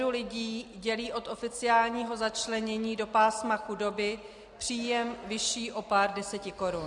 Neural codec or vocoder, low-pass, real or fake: none; 10.8 kHz; real